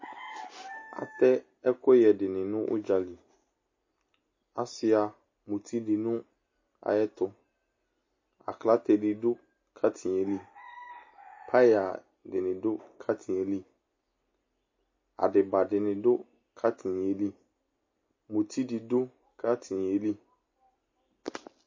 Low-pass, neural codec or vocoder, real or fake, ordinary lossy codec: 7.2 kHz; none; real; MP3, 32 kbps